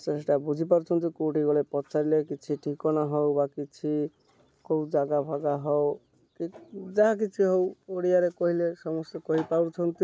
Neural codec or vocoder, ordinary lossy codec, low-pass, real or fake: none; none; none; real